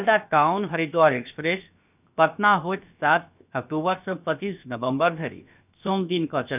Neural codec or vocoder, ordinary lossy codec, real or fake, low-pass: codec, 16 kHz, about 1 kbps, DyCAST, with the encoder's durations; none; fake; 3.6 kHz